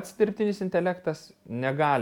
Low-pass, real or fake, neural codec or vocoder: 19.8 kHz; real; none